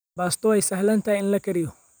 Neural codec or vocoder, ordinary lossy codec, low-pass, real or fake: vocoder, 44.1 kHz, 128 mel bands every 256 samples, BigVGAN v2; none; none; fake